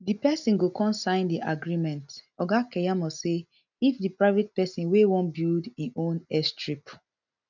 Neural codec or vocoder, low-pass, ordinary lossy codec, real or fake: none; 7.2 kHz; none; real